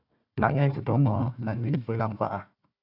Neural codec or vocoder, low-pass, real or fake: codec, 16 kHz, 1 kbps, FunCodec, trained on Chinese and English, 50 frames a second; 5.4 kHz; fake